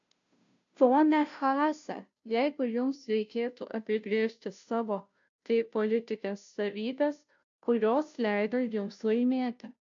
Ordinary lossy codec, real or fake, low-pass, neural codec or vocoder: AAC, 64 kbps; fake; 7.2 kHz; codec, 16 kHz, 0.5 kbps, FunCodec, trained on Chinese and English, 25 frames a second